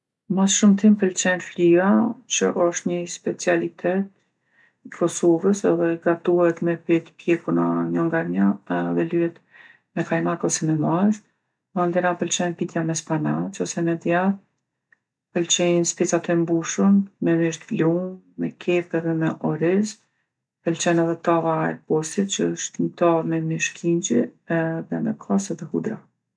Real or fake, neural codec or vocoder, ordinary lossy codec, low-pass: real; none; none; none